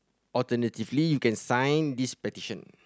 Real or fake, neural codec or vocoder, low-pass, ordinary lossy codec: real; none; none; none